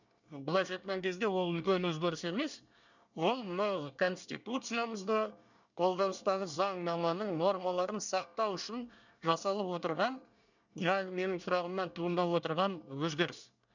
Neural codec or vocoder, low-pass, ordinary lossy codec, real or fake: codec, 24 kHz, 1 kbps, SNAC; 7.2 kHz; none; fake